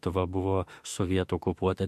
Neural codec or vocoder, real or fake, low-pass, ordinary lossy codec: autoencoder, 48 kHz, 32 numbers a frame, DAC-VAE, trained on Japanese speech; fake; 14.4 kHz; MP3, 64 kbps